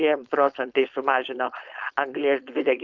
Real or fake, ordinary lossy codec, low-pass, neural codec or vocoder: fake; Opus, 24 kbps; 7.2 kHz; codec, 16 kHz, 4.8 kbps, FACodec